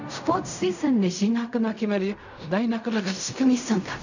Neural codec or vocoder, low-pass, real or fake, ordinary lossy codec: codec, 16 kHz in and 24 kHz out, 0.4 kbps, LongCat-Audio-Codec, fine tuned four codebook decoder; 7.2 kHz; fake; none